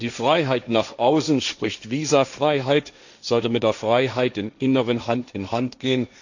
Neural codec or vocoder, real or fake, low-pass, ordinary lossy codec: codec, 16 kHz, 1.1 kbps, Voila-Tokenizer; fake; 7.2 kHz; none